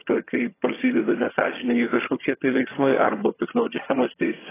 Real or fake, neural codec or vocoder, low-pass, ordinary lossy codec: fake; vocoder, 22.05 kHz, 80 mel bands, HiFi-GAN; 3.6 kHz; AAC, 16 kbps